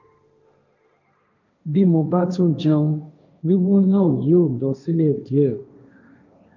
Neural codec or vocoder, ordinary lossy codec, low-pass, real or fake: codec, 16 kHz, 1.1 kbps, Voila-Tokenizer; none; 7.2 kHz; fake